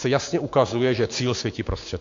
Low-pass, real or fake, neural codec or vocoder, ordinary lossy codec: 7.2 kHz; fake; codec, 16 kHz, 6 kbps, DAC; AAC, 48 kbps